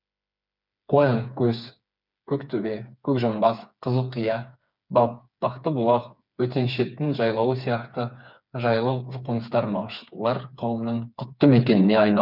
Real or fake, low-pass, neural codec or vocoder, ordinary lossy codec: fake; 5.4 kHz; codec, 16 kHz, 4 kbps, FreqCodec, smaller model; none